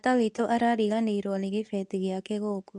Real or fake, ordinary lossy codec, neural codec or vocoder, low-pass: fake; none; codec, 24 kHz, 0.9 kbps, WavTokenizer, medium speech release version 2; 10.8 kHz